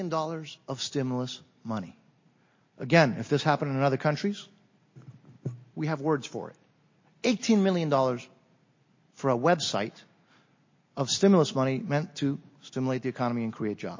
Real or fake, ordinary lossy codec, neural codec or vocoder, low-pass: real; MP3, 32 kbps; none; 7.2 kHz